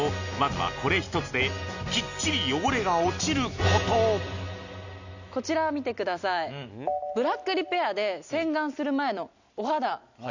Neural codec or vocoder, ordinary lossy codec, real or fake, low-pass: none; none; real; 7.2 kHz